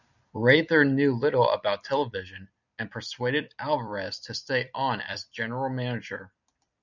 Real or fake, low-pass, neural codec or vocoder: fake; 7.2 kHz; vocoder, 44.1 kHz, 128 mel bands every 256 samples, BigVGAN v2